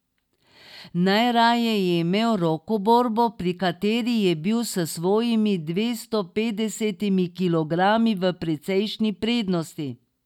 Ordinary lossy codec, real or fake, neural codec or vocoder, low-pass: none; real; none; 19.8 kHz